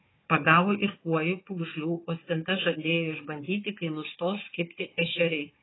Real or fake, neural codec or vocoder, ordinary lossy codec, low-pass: fake; codec, 16 kHz, 4 kbps, FunCodec, trained on Chinese and English, 50 frames a second; AAC, 16 kbps; 7.2 kHz